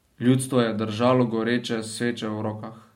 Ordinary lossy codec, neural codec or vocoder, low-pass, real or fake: MP3, 64 kbps; none; 19.8 kHz; real